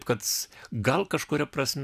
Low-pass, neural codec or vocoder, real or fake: 14.4 kHz; vocoder, 44.1 kHz, 128 mel bands every 256 samples, BigVGAN v2; fake